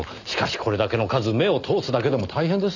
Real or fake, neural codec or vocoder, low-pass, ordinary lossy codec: real; none; 7.2 kHz; none